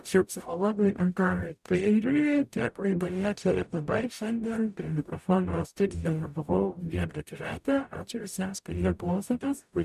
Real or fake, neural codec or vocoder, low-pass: fake; codec, 44.1 kHz, 0.9 kbps, DAC; 14.4 kHz